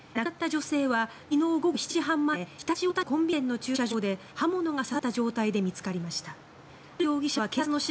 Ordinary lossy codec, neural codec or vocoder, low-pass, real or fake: none; none; none; real